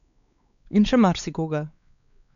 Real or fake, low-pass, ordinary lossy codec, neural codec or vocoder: fake; 7.2 kHz; none; codec, 16 kHz, 4 kbps, X-Codec, WavLM features, trained on Multilingual LibriSpeech